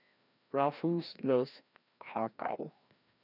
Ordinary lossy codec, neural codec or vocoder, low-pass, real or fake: none; codec, 16 kHz, 1 kbps, FreqCodec, larger model; 5.4 kHz; fake